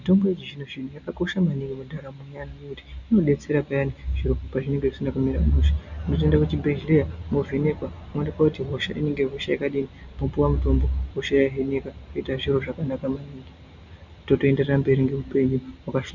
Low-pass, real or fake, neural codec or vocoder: 7.2 kHz; real; none